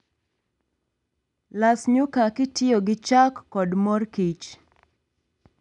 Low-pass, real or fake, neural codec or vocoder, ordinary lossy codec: 10.8 kHz; real; none; none